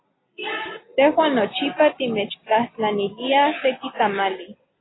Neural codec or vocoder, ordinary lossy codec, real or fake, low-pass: none; AAC, 16 kbps; real; 7.2 kHz